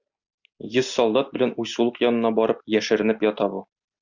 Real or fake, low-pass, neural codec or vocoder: real; 7.2 kHz; none